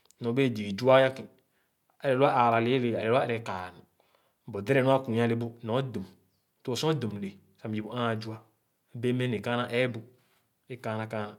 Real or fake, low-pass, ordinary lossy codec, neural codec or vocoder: real; 19.8 kHz; MP3, 96 kbps; none